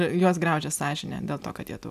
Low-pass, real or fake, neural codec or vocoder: 14.4 kHz; real; none